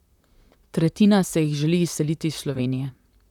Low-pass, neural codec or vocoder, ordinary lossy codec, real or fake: 19.8 kHz; vocoder, 44.1 kHz, 128 mel bands, Pupu-Vocoder; none; fake